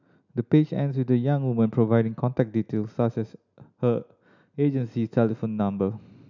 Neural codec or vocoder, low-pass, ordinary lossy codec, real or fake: none; 7.2 kHz; none; real